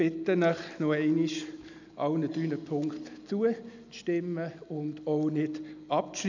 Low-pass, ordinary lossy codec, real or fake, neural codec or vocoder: 7.2 kHz; none; real; none